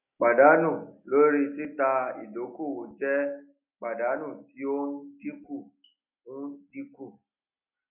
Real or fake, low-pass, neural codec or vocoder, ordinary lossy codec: real; 3.6 kHz; none; none